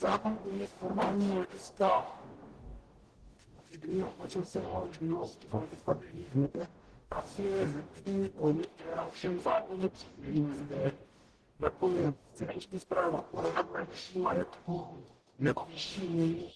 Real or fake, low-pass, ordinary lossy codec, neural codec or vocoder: fake; 10.8 kHz; Opus, 16 kbps; codec, 44.1 kHz, 0.9 kbps, DAC